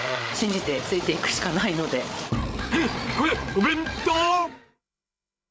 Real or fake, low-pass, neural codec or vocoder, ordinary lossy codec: fake; none; codec, 16 kHz, 8 kbps, FreqCodec, larger model; none